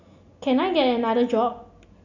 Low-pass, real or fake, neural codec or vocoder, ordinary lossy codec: 7.2 kHz; real; none; none